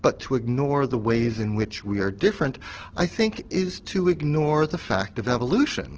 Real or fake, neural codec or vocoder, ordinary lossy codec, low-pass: real; none; Opus, 16 kbps; 7.2 kHz